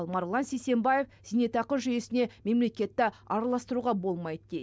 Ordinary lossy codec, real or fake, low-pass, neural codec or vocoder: none; real; none; none